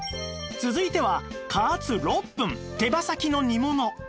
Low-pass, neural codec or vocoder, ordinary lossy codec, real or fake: none; none; none; real